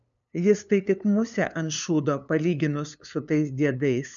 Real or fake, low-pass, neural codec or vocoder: fake; 7.2 kHz; codec, 16 kHz, 2 kbps, FunCodec, trained on LibriTTS, 25 frames a second